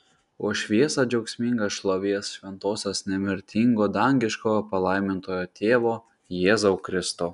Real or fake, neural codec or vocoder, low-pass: real; none; 10.8 kHz